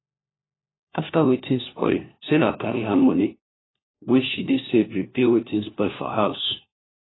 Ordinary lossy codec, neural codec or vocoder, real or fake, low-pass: AAC, 16 kbps; codec, 16 kHz, 1 kbps, FunCodec, trained on LibriTTS, 50 frames a second; fake; 7.2 kHz